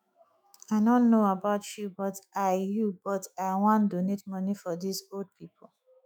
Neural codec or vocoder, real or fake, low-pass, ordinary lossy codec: autoencoder, 48 kHz, 128 numbers a frame, DAC-VAE, trained on Japanese speech; fake; none; none